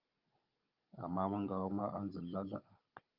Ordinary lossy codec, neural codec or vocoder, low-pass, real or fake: Opus, 24 kbps; codec, 16 kHz, 16 kbps, FunCodec, trained on Chinese and English, 50 frames a second; 5.4 kHz; fake